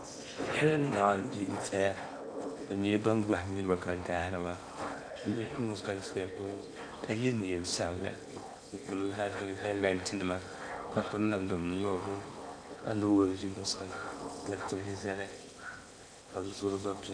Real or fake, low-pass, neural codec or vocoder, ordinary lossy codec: fake; 9.9 kHz; codec, 16 kHz in and 24 kHz out, 0.8 kbps, FocalCodec, streaming, 65536 codes; MP3, 96 kbps